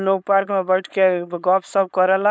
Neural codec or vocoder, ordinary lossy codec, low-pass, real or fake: codec, 16 kHz, 4.8 kbps, FACodec; none; none; fake